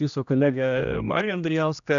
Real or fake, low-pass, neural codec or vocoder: fake; 7.2 kHz; codec, 16 kHz, 1 kbps, X-Codec, HuBERT features, trained on general audio